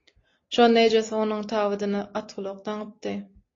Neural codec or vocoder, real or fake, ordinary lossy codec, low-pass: none; real; AAC, 32 kbps; 7.2 kHz